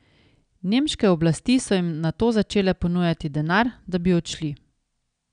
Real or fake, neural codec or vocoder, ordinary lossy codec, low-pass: real; none; none; 9.9 kHz